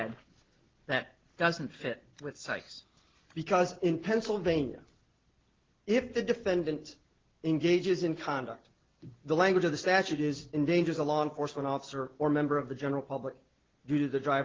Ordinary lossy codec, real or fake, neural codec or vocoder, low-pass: Opus, 16 kbps; real; none; 7.2 kHz